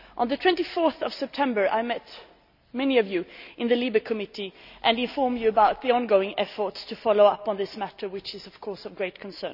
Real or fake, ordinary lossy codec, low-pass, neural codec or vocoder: real; none; 5.4 kHz; none